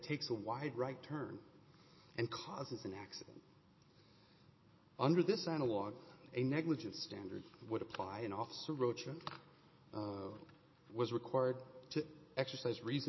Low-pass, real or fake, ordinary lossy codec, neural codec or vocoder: 7.2 kHz; real; MP3, 24 kbps; none